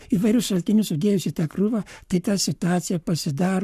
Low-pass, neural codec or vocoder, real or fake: 14.4 kHz; codec, 44.1 kHz, 7.8 kbps, Pupu-Codec; fake